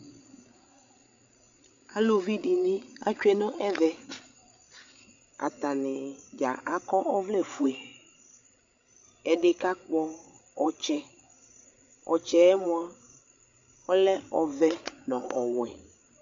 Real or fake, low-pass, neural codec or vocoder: fake; 7.2 kHz; codec, 16 kHz, 8 kbps, FreqCodec, larger model